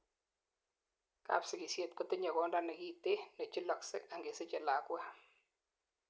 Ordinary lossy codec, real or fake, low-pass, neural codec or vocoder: none; real; none; none